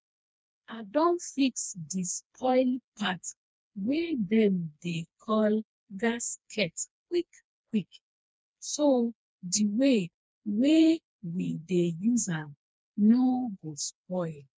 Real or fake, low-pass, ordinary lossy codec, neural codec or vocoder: fake; none; none; codec, 16 kHz, 2 kbps, FreqCodec, smaller model